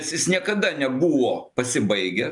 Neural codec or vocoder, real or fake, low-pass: none; real; 10.8 kHz